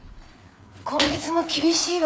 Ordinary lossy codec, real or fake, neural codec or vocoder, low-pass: none; fake; codec, 16 kHz, 4 kbps, FreqCodec, larger model; none